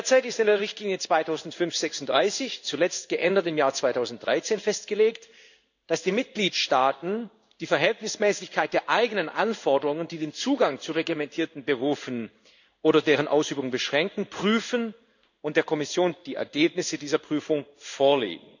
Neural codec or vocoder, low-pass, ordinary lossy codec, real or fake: codec, 16 kHz in and 24 kHz out, 1 kbps, XY-Tokenizer; 7.2 kHz; none; fake